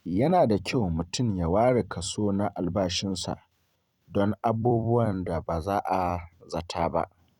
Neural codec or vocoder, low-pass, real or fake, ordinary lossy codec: vocoder, 44.1 kHz, 128 mel bands every 256 samples, BigVGAN v2; 19.8 kHz; fake; none